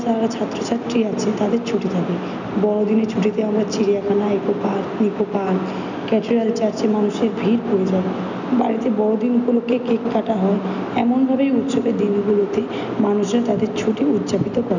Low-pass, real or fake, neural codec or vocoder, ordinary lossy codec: 7.2 kHz; real; none; none